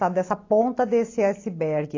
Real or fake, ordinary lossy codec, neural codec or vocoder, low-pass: real; AAC, 32 kbps; none; 7.2 kHz